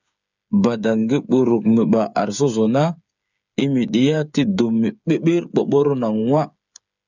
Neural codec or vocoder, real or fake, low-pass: codec, 16 kHz, 8 kbps, FreqCodec, smaller model; fake; 7.2 kHz